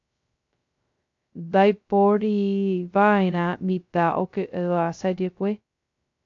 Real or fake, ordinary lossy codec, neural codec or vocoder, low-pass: fake; AAC, 48 kbps; codec, 16 kHz, 0.2 kbps, FocalCodec; 7.2 kHz